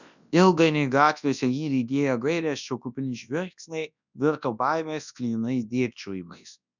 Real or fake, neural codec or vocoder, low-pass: fake; codec, 24 kHz, 0.9 kbps, WavTokenizer, large speech release; 7.2 kHz